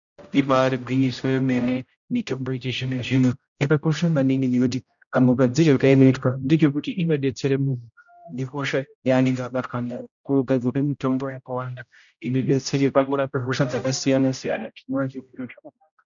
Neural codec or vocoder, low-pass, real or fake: codec, 16 kHz, 0.5 kbps, X-Codec, HuBERT features, trained on general audio; 7.2 kHz; fake